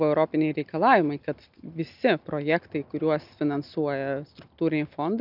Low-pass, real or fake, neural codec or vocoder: 5.4 kHz; real; none